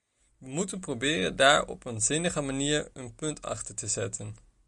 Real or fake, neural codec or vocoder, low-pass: real; none; 10.8 kHz